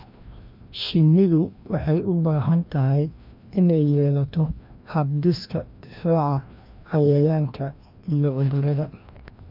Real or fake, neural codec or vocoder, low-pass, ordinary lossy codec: fake; codec, 16 kHz, 1 kbps, FreqCodec, larger model; 5.4 kHz; MP3, 48 kbps